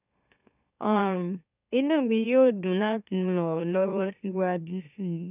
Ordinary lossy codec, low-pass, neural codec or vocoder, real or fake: none; 3.6 kHz; autoencoder, 44.1 kHz, a latent of 192 numbers a frame, MeloTTS; fake